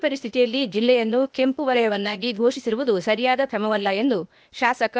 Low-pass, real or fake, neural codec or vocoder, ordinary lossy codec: none; fake; codec, 16 kHz, 0.8 kbps, ZipCodec; none